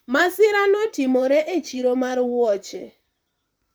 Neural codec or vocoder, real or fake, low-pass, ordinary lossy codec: vocoder, 44.1 kHz, 128 mel bands, Pupu-Vocoder; fake; none; none